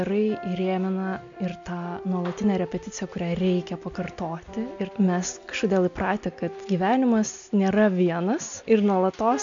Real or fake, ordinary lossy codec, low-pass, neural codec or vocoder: real; MP3, 64 kbps; 7.2 kHz; none